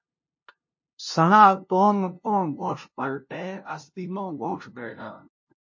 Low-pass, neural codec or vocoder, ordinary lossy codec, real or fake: 7.2 kHz; codec, 16 kHz, 0.5 kbps, FunCodec, trained on LibriTTS, 25 frames a second; MP3, 32 kbps; fake